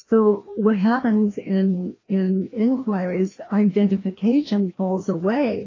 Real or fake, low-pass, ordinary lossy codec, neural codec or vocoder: fake; 7.2 kHz; AAC, 32 kbps; codec, 16 kHz, 1 kbps, FreqCodec, larger model